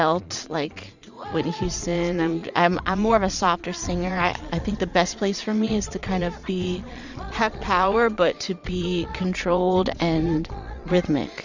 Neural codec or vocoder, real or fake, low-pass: vocoder, 22.05 kHz, 80 mel bands, Vocos; fake; 7.2 kHz